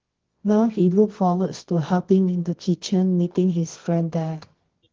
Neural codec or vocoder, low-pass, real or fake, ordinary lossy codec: codec, 24 kHz, 0.9 kbps, WavTokenizer, medium music audio release; 7.2 kHz; fake; Opus, 16 kbps